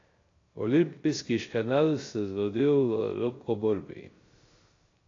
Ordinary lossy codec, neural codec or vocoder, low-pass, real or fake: AAC, 32 kbps; codec, 16 kHz, 0.3 kbps, FocalCodec; 7.2 kHz; fake